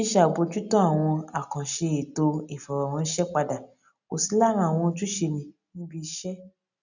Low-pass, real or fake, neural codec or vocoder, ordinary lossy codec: 7.2 kHz; real; none; none